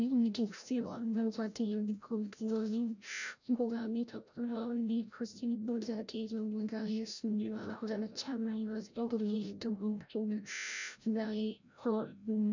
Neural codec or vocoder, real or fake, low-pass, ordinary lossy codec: codec, 16 kHz, 0.5 kbps, FreqCodec, larger model; fake; 7.2 kHz; none